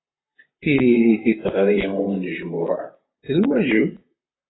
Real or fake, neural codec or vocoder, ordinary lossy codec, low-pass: fake; vocoder, 44.1 kHz, 128 mel bands, Pupu-Vocoder; AAC, 16 kbps; 7.2 kHz